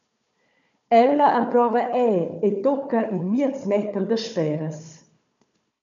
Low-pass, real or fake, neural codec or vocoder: 7.2 kHz; fake; codec, 16 kHz, 4 kbps, FunCodec, trained on Chinese and English, 50 frames a second